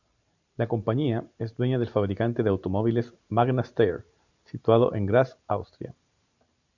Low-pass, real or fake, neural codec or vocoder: 7.2 kHz; fake; vocoder, 44.1 kHz, 128 mel bands every 512 samples, BigVGAN v2